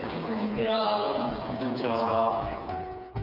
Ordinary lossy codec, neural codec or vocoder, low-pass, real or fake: none; codec, 16 kHz, 4 kbps, FreqCodec, smaller model; 5.4 kHz; fake